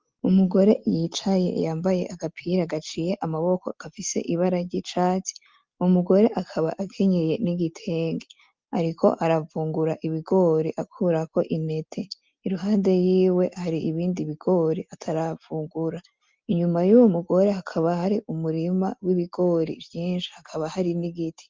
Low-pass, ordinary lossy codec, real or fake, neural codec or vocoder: 7.2 kHz; Opus, 32 kbps; real; none